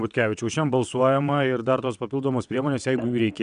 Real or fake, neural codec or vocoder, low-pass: fake; vocoder, 22.05 kHz, 80 mel bands, WaveNeXt; 9.9 kHz